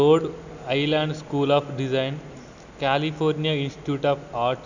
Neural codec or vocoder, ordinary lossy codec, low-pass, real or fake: none; none; 7.2 kHz; real